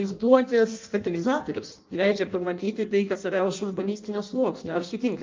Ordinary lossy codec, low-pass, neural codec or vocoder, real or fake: Opus, 24 kbps; 7.2 kHz; codec, 16 kHz in and 24 kHz out, 0.6 kbps, FireRedTTS-2 codec; fake